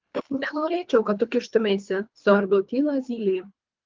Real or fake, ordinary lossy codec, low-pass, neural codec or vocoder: fake; Opus, 32 kbps; 7.2 kHz; codec, 24 kHz, 3 kbps, HILCodec